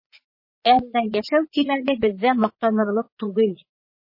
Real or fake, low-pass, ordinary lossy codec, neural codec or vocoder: fake; 5.4 kHz; MP3, 24 kbps; vocoder, 22.05 kHz, 80 mel bands, Vocos